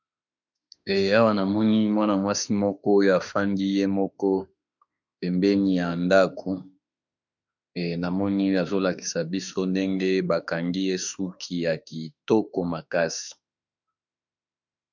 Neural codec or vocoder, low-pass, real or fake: autoencoder, 48 kHz, 32 numbers a frame, DAC-VAE, trained on Japanese speech; 7.2 kHz; fake